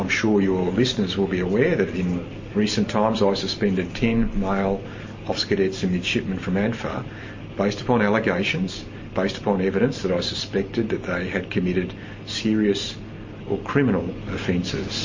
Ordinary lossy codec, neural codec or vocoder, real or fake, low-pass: MP3, 32 kbps; none; real; 7.2 kHz